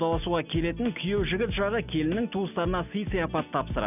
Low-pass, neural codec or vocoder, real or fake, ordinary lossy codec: 3.6 kHz; none; real; none